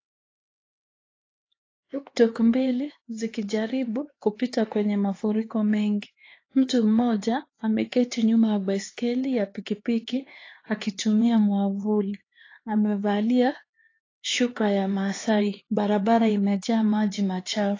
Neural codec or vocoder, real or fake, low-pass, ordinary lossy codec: codec, 16 kHz, 4 kbps, X-Codec, HuBERT features, trained on LibriSpeech; fake; 7.2 kHz; AAC, 32 kbps